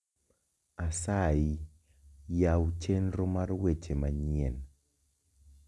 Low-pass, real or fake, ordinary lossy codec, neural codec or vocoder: none; real; none; none